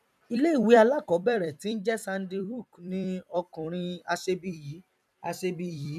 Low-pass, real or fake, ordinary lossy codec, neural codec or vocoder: 14.4 kHz; fake; none; vocoder, 44.1 kHz, 128 mel bands every 256 samples, BigVGAN v2